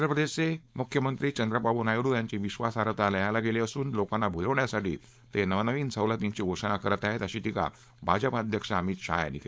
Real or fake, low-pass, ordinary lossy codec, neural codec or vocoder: fake; none; none; codec, 16 kHz, 4.8 kbps, FACodec